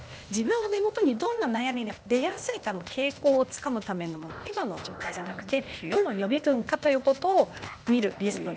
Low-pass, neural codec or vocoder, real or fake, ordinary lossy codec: none; codec, 16 kHz, 0.8 kbps, ZipCodec; fake; none